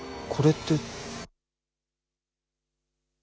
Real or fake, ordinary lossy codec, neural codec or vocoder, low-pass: real; none; none; none